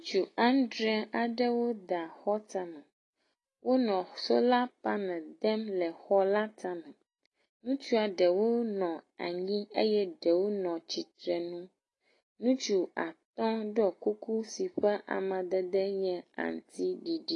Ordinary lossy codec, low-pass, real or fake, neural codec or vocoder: AAC, 32 kbps; 10.8 kHz; real; none